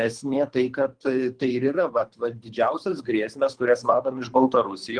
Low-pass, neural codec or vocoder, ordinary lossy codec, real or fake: 9.9 kHz; codec, 24 kHz, 3 kbps, HILCodec; Opus, 24 kbps; fake